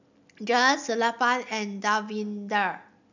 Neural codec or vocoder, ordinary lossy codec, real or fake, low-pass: none; none; real; 7.2 kHz